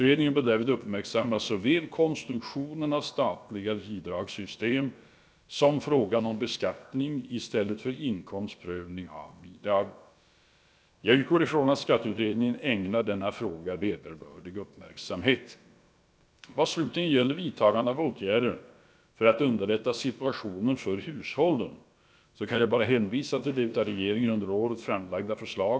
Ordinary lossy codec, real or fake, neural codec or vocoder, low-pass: none; fake; codec, 16 kHz, about 1 kbps, DyCAST, with the encoder's durations; none